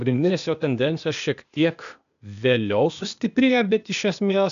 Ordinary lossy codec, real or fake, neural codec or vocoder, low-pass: AAC, 96 kbps; fake; codec, 16 kHz, 0.8 kbps, ZipCodec; 7.2 kHz